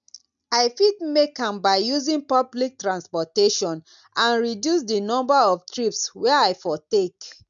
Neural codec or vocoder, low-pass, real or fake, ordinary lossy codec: none; 7.2 kHz; real; none